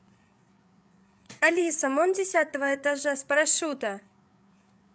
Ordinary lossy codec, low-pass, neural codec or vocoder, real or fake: none; none; codec, 16 kHz, 8 kbps, FreqCodec, larger model; fake